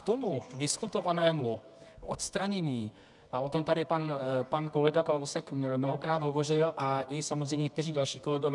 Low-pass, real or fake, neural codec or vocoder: 10.8 kHz; fake; codec, 24 kHz, 0.9 kbps, WavTokenizer, medium music audio release